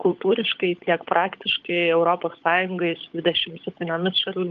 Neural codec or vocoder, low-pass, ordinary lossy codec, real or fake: codec, 16 kHz, 16 kbps, FunCodec, trained on LibriTTS, 50 frames a second; 7.2 kHz; Opus, 32 kbps; fake